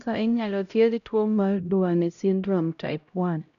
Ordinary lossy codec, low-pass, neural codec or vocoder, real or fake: none; 7.2 kHz; codec, 16 kHz, 0.5 kbps, X-Codec, HuBERT features, trained on LibriSpeech; fake